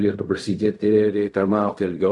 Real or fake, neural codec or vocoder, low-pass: fake; codec, 16 kHz in and 24 kHz out, 0.4 kbps, LongCat-Audio-Codec, fine tuned four codebook decoder; 10.8 kHz